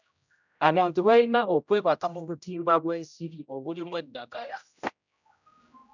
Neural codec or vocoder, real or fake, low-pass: codec, 16 kHz, 0.5 kbps, X-Codec, HuBERT features, trained on general audio; fake; 7.2 kHz